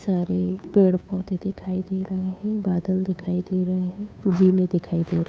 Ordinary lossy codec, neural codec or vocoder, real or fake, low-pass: none; codec, 16 kHz, 2 kbps, FunCodec, trained on Chinese and English, 25 frames a second; fake; none